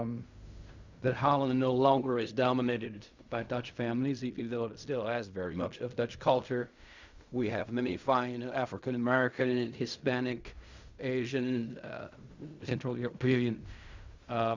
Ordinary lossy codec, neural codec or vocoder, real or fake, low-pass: Opus, 64 kbps; codec, 16 kHz in and 24 kHz out, 0.4 kbps, LongCat-Audio-Codec, fine tuned four codebook decoder; fake; 7.2 kHz